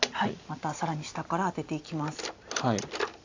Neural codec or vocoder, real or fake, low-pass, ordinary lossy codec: none; real; 7.2 kHz; AAC, 48 kbps